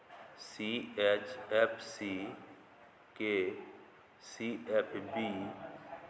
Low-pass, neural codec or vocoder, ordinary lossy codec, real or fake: none; none; none; real